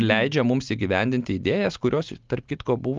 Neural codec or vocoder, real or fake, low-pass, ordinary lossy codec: none; real; 7.2 kHz; Opus, 32 kbps